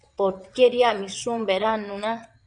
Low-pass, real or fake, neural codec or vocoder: 9.9 kHz; fake; vocoder, 22.05 kHz, 80 mel bands, WaveNeXt